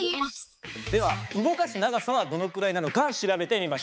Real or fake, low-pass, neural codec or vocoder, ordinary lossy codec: fake; none; codec, 16 kHz, 4 kbps, X-Codec, HuBERT features, trained on balanced general audio; none